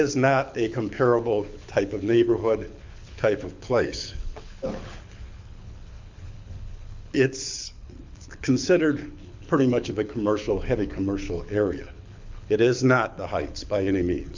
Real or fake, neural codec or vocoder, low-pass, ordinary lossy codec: fake; codec, 24 kHz, 6 kbps, HILCodec; 7.2 kHz; MP3, 64 kbps